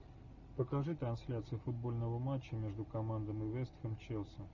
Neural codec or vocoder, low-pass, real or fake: none; 7.2 kHz; real